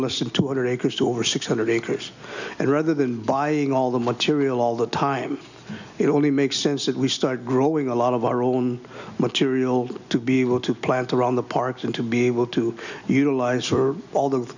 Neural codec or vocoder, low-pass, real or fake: none; 7.2 kHz; real